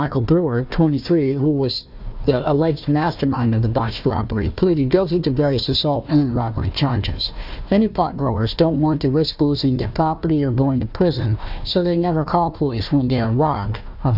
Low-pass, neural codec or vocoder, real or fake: 5.4 kHz; codec, 16 kHz, 1 kbps, FunCodec, trained on Chinese and English, 50 frames a second; fake